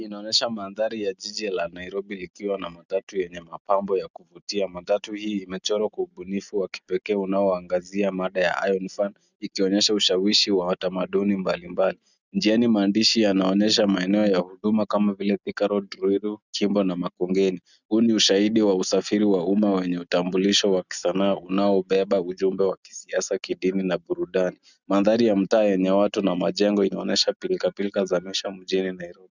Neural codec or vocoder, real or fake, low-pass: none; real; 7.2 kHz